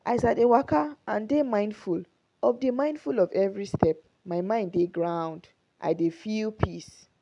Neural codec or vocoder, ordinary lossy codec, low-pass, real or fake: none; none; 10.8 kHz; real